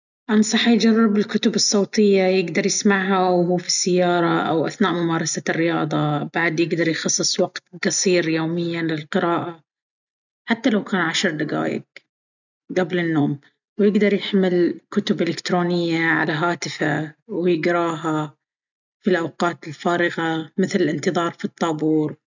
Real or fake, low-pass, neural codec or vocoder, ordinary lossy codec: real; 7.2 kHz; none; none